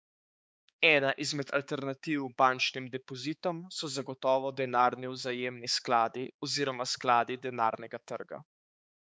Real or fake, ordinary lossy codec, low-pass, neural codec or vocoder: fake; none; none; codec, 16 kHz, 4 kbps, X-Codec, HuBERT features, trained on balanced general audio